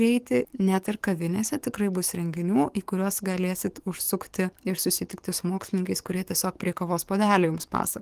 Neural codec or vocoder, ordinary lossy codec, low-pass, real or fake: codec, 44.1 kHz, 7.8 kbps, DAC; Opus, 24 kbps; 14.4 kHz; fake